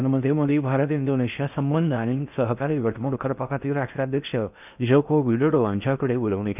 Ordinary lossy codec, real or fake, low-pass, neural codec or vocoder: none; fake; 3.6 kHz; codec, 16 kHz in and 24 kHz out, 0.6 kbps, FocalCodec, streaming, 4096 codes